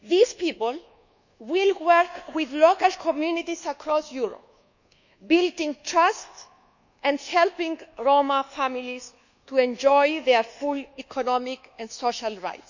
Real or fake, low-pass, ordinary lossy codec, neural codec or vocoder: fake; 7.2 kHz; none; codec, 24 kHz, 1.2 kbps, DualCodec